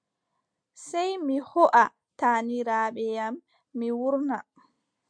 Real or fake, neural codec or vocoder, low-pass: real; none; 9.9 kHz